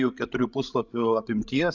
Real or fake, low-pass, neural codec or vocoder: fake; 7.2 kHz; codec, 16 kHz, 16 kbps, FreqCodec, larger model